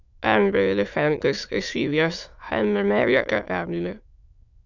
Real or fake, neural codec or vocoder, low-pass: fake; autoencoder, 22.05 kHz, a latent of 192 numbers a frame, VITS, trained on many speakers; 7.2 kHz